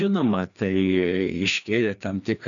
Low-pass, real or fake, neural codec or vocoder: 7.2 kHz; fake; codec, 16 kHz, 2 kbps, FreqCodec, larger model